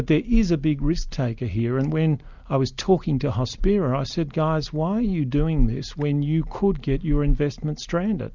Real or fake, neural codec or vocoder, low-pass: real; none; 7.2 kHz